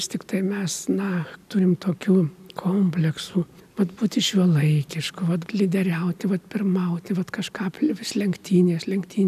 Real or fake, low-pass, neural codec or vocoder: real; 14.4 kHz; none